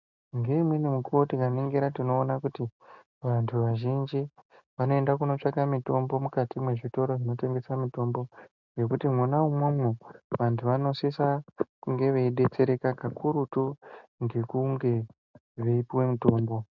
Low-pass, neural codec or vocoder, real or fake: 7.2 kHz; none; real